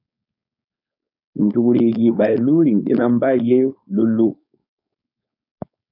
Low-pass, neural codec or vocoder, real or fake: 5.4 kHz; codec, 16 kHz, 4.8 kbps, FACodec; fake